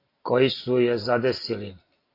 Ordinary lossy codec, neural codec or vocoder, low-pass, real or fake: AAC, 32 kbps; none; 5.4 kHz; real